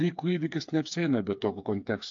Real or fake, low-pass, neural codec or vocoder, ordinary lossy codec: fake; 7.2 kHz; codec, 16 kHz, 4 kbps, FreqCodec, smaller model; AAC, 48 kbps